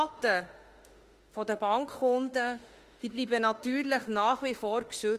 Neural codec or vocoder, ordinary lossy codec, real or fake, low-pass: autoencoder, 48 kHz, 32 numbers a frame, DAC-VAE, trained on Japanese speech; Opus, 24 kbps; fake; 14.4 kHz